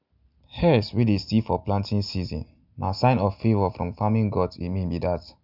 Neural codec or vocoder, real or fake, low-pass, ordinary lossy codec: none; real; 5.4 kHz; none